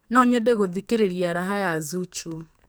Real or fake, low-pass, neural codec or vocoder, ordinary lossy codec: fake; none; codec, 44.1 kHz, 2.6 kbps, SNAC; none